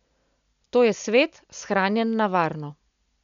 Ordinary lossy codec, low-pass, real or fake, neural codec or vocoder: none; 7.2 kHz; real; none